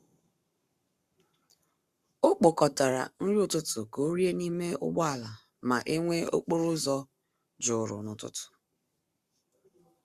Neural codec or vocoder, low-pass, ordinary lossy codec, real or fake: codec, 44.1 kHz, 7.8 kbps, Pupu-Codec; 14.4 kHz; Opus, 64 kbps; fake